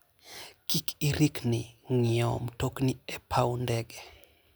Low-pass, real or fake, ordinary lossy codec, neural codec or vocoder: none; real; none; none